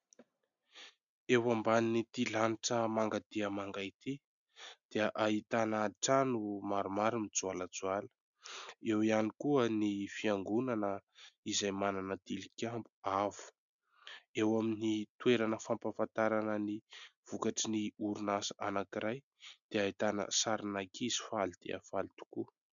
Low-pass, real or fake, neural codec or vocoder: 7.2 kHz; real; none